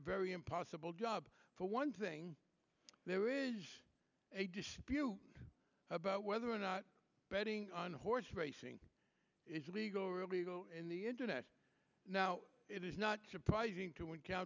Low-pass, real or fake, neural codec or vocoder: 7.2 kHz; real; none